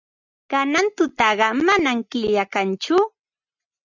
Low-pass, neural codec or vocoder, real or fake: 7.2 kHz; none; real